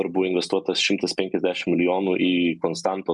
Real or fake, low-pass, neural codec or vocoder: real; 10.8 kHz; none